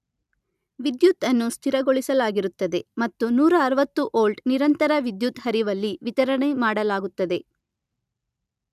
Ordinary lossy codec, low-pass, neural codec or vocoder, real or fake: none; 14.4 kHz; none; real